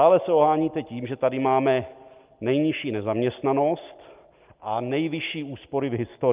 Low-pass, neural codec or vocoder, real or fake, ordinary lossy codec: 3.6 kHz; none; real; Opus, 24 kbps